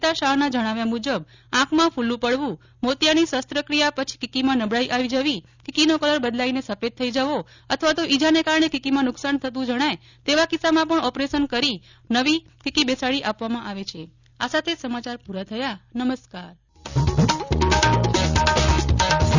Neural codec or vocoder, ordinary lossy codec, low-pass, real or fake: none; none; 7.2 kHz; real